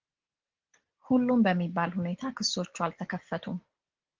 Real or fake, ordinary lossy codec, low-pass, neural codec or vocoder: real; Opus, 16 kbps; 7.2 kHz; none